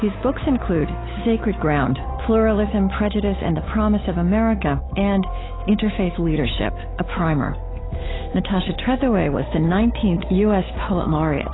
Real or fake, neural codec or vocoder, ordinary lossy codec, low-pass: fake; codec, 16 kHz, 8 kbps, FunCodec, trained on Chinese and English, 25 frames a second; AAC, 16 kbps; 7.2 kHz